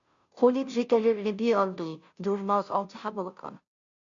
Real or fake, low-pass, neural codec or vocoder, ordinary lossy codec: fake; 7.2 kHz; codec, 16 kHz, 0.5 kbps, FunCodec, trained on Chinese and English, 25 frames a second; MP3, 64 kbps